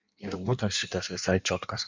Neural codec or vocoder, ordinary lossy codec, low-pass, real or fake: codec, 16 kHz in and 24 kHz out, 1.1 kbps, FireRedTTS-2 codec; MP3, 64 kbps; 7.2 kHz; fake